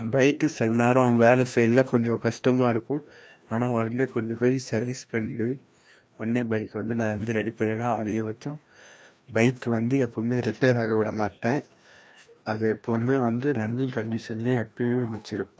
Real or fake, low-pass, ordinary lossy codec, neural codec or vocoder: fake; none; none; codec, 16 kHz, 1 kbps, FreqCodec, larger model